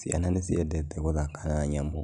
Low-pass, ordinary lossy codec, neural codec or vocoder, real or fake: 10.8 kHz; none; none; real